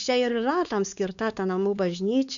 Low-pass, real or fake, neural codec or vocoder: 7.2 kHz; fake; codec, 16 kHz, 4 kbps, X-Codec, WavLM features, trained on Multilingual LibriSpeech